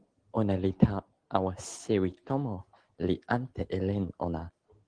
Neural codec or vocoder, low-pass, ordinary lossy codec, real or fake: none; 9.9 kHz; Opus, 16 kbps; real